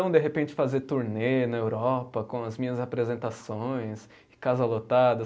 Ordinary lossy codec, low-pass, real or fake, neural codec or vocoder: none; none; real; none